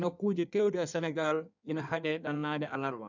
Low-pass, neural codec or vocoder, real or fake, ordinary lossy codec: 7.2 kHz; codec, 16 kHz in and 24 kHz out, 1.1 kbps, FireRedTTS-2 codec; fake; none